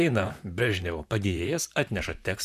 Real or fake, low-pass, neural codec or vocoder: fake; 14.4 kHz; vocoder, 44.1 kHz, 128 mel bands, Pupu-Vocoder